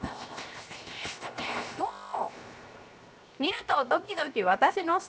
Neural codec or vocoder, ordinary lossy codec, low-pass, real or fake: codec, 16 kHz, 0.7 kbps, FocalCodec; none; none; fake